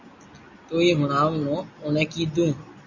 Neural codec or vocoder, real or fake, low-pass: none; real; 7.2 kHz